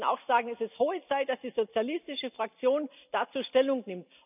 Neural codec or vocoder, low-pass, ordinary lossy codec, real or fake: none; 3.6 kHz; none; real